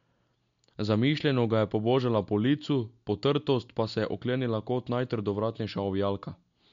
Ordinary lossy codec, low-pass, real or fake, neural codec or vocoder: MP3, 64 kbps; 7.2 kHz; real; none